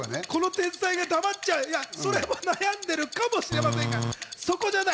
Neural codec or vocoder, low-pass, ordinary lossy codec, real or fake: none; none; none; real